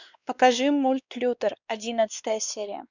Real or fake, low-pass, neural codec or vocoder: fake; 7.2 kHz; codec, 16 kHz, 2 kbps, X-Codec, WavLM features, trained on Multilingual LibriSpeech